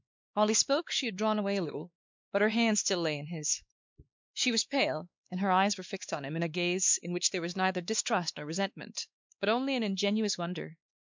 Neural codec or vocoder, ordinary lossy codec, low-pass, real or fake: codec, 16 kHz, 2 kbps, X-Codec, WavLM features, trained on Multilingual LibriSpeech; MP3, 64 kbps; 7.2 kHz; fake